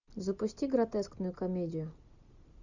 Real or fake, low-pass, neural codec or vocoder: real; 7.2 kHz; none